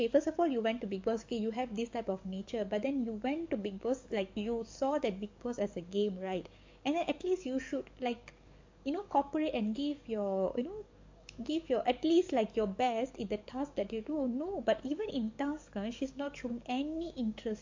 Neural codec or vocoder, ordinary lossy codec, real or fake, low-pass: codec, 44.1 kHz, 7.8 kbps, DAC; MP3, 48 kbps; fake; 7.2 kHz